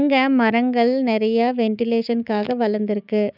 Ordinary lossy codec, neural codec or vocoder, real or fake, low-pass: none; codec, 16 kHz, 6 kbps, DAC; fake; 5.4 kHz